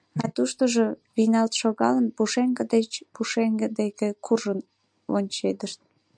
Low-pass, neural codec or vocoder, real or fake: 9.9 kHz; none; real